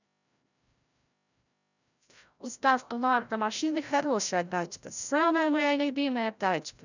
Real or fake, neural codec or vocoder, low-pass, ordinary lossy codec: fake; codec, 16 kHz, 0.5 kbps, FreqCodec, larger model; 7.2 kHz; none